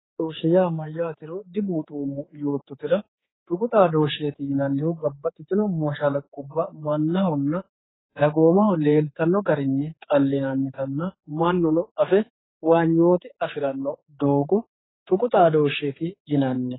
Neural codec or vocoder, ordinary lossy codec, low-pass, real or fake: codec, 16 kHz, 4 kbps, X-Codec, HuBERT features, trained on general audio; AAC, 16 kbps; 7.2 kHz; fake